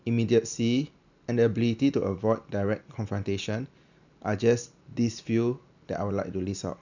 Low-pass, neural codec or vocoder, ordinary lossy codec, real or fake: 7.2 kHz; none; none; real